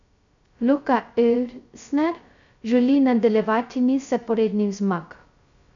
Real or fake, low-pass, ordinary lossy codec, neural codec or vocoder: fake; 7.2 kHz; none; codec, 16 kHz, 0.2 kbps, FocalCodec